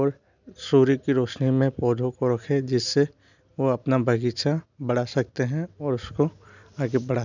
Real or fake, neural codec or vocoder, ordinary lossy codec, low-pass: real; none; none; 7.2 kHz